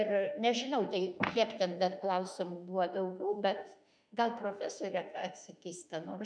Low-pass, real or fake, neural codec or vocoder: 9.9 kHz; fake; autoencoder, 48 kHz, 32 numbers a frame, DAC-VAE, trained on Japanese speech